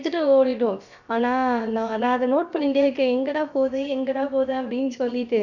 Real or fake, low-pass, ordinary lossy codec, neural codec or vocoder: fake; 7.2 kHz; none; codec, 16 kHz, about 1 kbps, DyCAST, with the encoder's durations